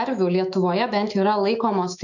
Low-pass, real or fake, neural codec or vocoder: 7.2 kHz; fake; autoencoder, 48 kHz, 128 numbers a frame, DAC-VAE, trained on Japanese speech